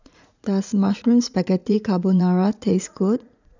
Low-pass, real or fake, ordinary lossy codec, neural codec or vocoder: 7.2 kHz; fake; none; vocoder, 44.1 kHz, 80 mel bands, Vocos